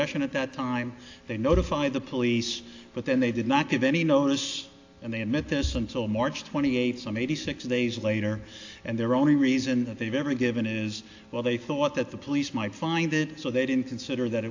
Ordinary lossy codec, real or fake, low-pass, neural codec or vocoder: AAC, 48 kbps; real; 7.2 kHz; none